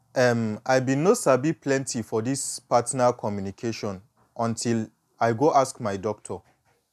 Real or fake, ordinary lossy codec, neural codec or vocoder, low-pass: real; none; none; 14.4 kHz